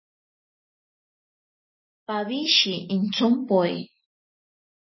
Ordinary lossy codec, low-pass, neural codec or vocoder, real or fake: MP3, 24 kbps; 7.2 kHz; none; real